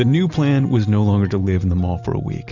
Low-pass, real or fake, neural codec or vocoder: 7.2 kHz; real; none